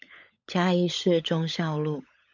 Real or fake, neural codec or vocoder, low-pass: fake; codec, 16 kHz, 16 kbps, FunCodec, trained on LibriTTS, 50 frames a second; 7.2 kHz